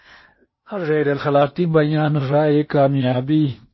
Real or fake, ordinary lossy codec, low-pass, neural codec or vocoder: fake; MP3, 24 kbps; 7.2 kHz; codec, 16 kHz in and 24 kHz out, 0.8 kbps, FocalCodec, streaming, 65536 codes